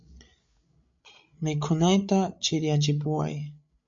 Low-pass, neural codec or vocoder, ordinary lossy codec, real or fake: 7.2 kHz; codec, 16 kHz, 8 kbps, FreqCodec, larger model; MP3, 48 kbps; fake